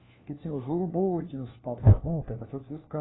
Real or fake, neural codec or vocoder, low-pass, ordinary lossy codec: fake; codec, 16 kHz, 1 kbps, FunCodec, trained on LibriTTS, 50 frames a second; 7.2 kHz; AAC, 16 kbps